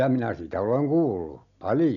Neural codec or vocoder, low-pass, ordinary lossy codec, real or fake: none; 7.2 kHz; none; real